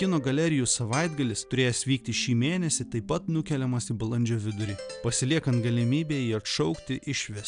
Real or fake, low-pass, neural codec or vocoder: real; 9.9 kHz; none